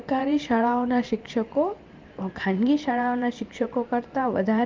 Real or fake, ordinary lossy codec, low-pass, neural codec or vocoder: fake; Opus, 24 kbps; 7.2 kHz; vocoder, 44.1 kHz, 128 mel bands every 512 samples, BigVGAN v2